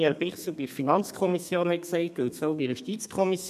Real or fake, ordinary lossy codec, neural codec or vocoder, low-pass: fake; none; codec, 32 kHz, 1.9 kbps, SNAC; 14.4 kHz